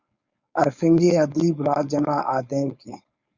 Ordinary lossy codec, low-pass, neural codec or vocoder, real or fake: Opus, 64 kbps; 7.2 kHz; codec, 16 kHz, 4.8 kbps, FACodec; fake